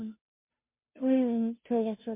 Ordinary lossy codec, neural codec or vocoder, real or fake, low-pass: MP3, 24 kbps; codec, 16 kHz, 0.5 kbps, FunCodec, trained on Chinese and English, 25 frames a second; fake; 3.6 kHz